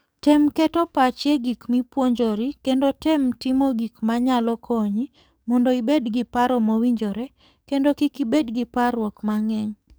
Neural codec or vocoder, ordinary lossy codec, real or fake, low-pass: codec, 44.1 kHz, 7.8 kbps, DAC; none; fake; none